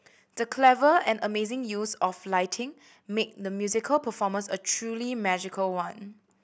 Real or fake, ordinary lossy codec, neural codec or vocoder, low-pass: real; none; none; none